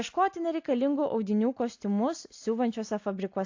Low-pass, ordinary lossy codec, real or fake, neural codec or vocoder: 7.2 kHz; AAC, 48 kbps; real; none